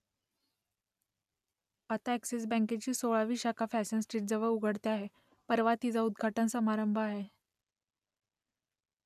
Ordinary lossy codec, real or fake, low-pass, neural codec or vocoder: none; real; 14.4 kHz; none